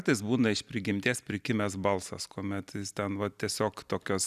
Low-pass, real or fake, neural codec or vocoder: 14.4 kHz; real; none